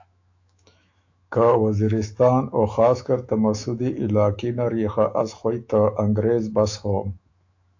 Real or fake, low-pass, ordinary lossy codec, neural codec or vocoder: fake; 7.2 kHz; AAC, 48 kbps; autoencoder, 48 kHz, 128 numbers a frame, DAC-VAE, trained on Japanese speech